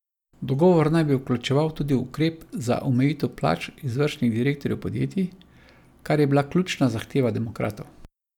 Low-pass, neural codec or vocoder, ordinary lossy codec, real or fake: 19.8 kHz; none; none; real